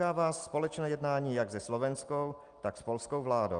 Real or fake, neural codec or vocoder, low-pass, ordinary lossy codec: real; none; 9.9 kHz; Opus, 32 kbps